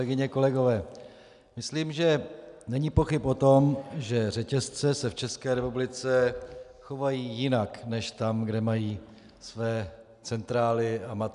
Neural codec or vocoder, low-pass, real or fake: none; 10.8 kHz; real